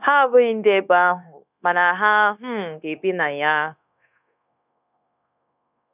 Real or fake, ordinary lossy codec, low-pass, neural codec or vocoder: fake; none; 3.6 kHz; codec, 16 kHz, 0.9 kbps, LongCat-Audio-Codec